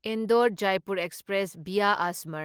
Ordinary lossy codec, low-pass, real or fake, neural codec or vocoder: Opus, 24 kbps; 19.8 kHz; real; none